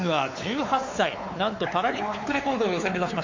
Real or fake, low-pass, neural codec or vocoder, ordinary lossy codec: fake; 7.2 kHz; codec, 16 kHz, 4 kbps, X-Codec, WavLM features, trained on Multilingual LibriSpeech; MP3, 48 kbps